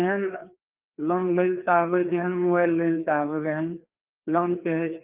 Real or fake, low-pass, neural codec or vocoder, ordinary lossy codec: fake; 3.6 kHz; codec, 16 kHz, 2 kbps, FreqCodec, larger model; Opus, 24 kbps